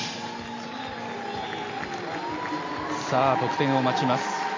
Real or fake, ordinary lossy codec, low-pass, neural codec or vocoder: real; AAC, 32 kbps; 7.2 kHz; none